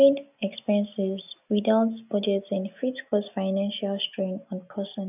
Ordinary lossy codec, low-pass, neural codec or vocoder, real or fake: none; 3.6 kHz; none; real